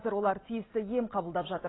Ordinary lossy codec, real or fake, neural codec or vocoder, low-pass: AAC, 16 kbps; real; none; 7.2 kHz